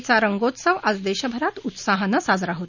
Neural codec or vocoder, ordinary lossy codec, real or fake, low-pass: none; none; real; 7.2 kHz